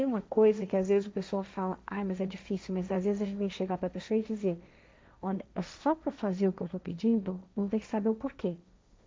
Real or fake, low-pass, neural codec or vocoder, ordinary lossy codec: fake; none; codec, 16 kHz, 1.1 kbps, Voila-Tokenizer; none